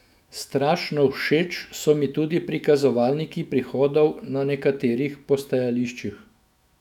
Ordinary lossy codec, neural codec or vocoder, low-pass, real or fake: none; autoencoder, 48 kHz, 128 numbers a frame, DAC-VAE, trained on Japanese speech; 19.8 kHz; fake